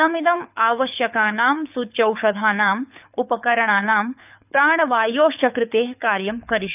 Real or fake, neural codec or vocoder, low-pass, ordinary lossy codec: fake; codec, 24 kHz, 6 kbps, HILCodec; 3.6 kHz; none